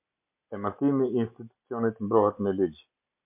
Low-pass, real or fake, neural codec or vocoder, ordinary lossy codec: 3.6 kHz; real; none; MP3, 32 kbps